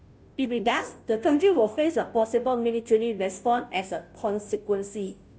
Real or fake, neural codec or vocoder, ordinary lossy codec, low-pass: fake; codec, 16 kHz, 0.5 kbps, FunCodec, trained on Chinese and English, 25 frames a second; none; none